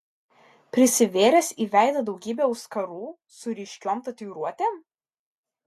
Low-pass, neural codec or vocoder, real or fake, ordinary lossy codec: 14.4 kHz; none; real; AAC, 64 kbps